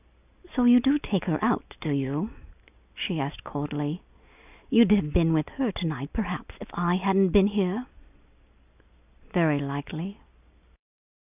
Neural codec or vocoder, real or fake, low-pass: none; real; 3.6 kHz